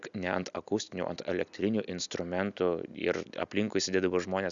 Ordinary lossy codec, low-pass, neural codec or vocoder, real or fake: Opus, 64 kbps; 7.2 kHz; none; real